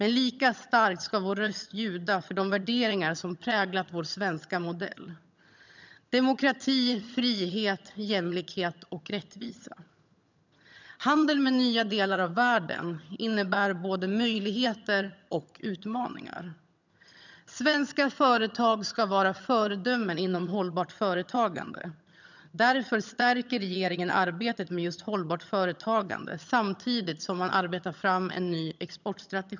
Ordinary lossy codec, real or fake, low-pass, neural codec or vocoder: none; fake; 7.2 kHz; vocoder, 22.05 kHz, 80 mel bands, HiFi-GAN